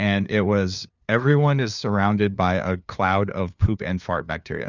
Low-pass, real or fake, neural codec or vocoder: 7.2 kHz; fake; codec, 16 kHz, 2 kbps, FunCodec, trained on LibriTTS, 25 frames a second